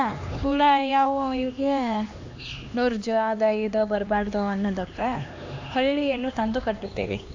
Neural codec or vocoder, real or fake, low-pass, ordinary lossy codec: codec, 16 kHz, 2 kbps, X-Codec, HuBERT features, trained on LibriSpeech; fake; 7.2 kHz; AAC, 48 kbps